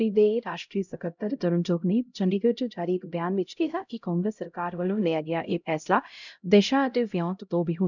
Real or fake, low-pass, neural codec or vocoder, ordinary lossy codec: fake; 7.2 kHz; codec, 16 kHz, 0.5 kbps, X-Codec, HuBERT features, trained on LibriSpeech; none